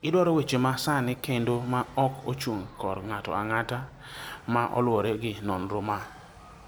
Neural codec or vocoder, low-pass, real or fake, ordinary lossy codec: none; none; real; none